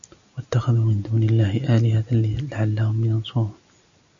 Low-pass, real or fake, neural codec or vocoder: 7.2 kHz; real; none